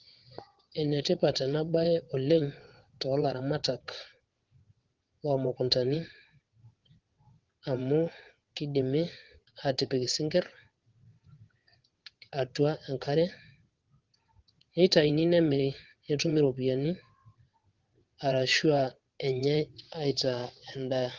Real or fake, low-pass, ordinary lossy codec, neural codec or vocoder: fake; 7.2 kHz; Opus, 16 kbps; vocoder, 22.05 kHz, 80 mel bands, WaveNeXt